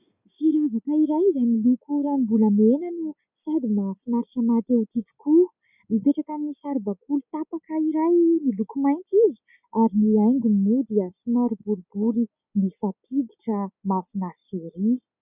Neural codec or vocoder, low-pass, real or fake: none; 3.6 kHz; real